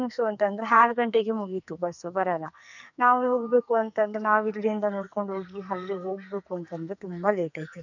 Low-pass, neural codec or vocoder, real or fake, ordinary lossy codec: 7.2 kHz; codec, 44.1 kHz, 2.6 kbps, SNAC; fake; none